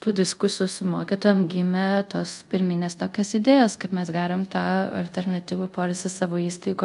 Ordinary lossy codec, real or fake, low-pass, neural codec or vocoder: AAC, 96 kbps; fake; 10.8 kHz; codec, 24 kHz, 0.5 kbps, DualCodec